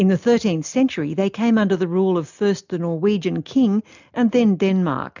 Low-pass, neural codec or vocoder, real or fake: 7.2 kHz; none; real